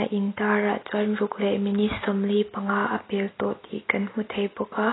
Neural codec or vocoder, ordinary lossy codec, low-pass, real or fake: none; AAC, 16 kbps; 7.2 kHz; real